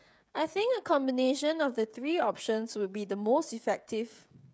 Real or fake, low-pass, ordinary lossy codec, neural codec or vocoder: fake; none; none; codec, 16 kHz, 16 kbps, FreqCodec, smaller model